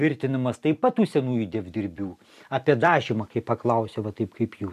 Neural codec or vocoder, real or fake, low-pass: vocoder, 44.1 kHz, 128 mel bands every 256 samples, BigVGAN v2; fake; 14.4 kHz